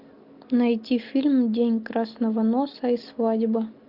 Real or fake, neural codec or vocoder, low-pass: real; none; 5.4 kHz